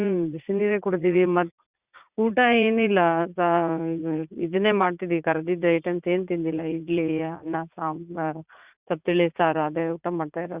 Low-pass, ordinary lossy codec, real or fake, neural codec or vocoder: 3.6 kHz; Opus, 64 kbps; fake; vocoder, 44.1 kHz, 80 mel bands, Vocos